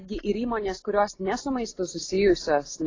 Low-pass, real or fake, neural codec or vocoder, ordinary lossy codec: 7.2 kHz; real; none; AAC, 32 kbps